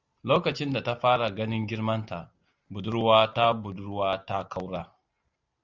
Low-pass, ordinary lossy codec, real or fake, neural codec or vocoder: 7.2 kHz; Opus, 64 kbps; real; none